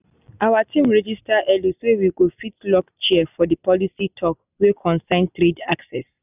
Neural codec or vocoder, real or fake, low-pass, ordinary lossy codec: none; real; 3.6 kHz; none